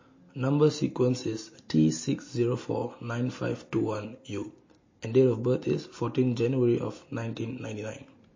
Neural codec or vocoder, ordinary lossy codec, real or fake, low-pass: none; MP3, 32 kbps; real; 7.2 kHz